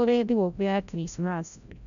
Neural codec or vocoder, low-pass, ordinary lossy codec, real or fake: codec, 16 kHz, 0.5 kbps, FreqCodec, larger model; 7.2 kHz; MP3, 96 kbps; fake